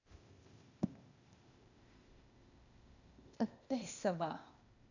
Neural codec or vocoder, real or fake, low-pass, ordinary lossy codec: codec, 16 kHz, 0.8 kbps, ZipCodec; fake; 7.2 kHz; none